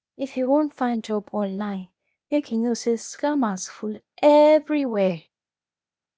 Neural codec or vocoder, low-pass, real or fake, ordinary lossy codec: codec, 16 kHz, 0.8 kbps, ZipCodec; none; fake; none